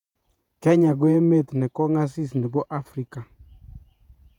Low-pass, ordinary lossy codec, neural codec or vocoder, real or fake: 19.8 kHz; none; vocoder, 48 kHz, 128 mel bands, Vocos; fake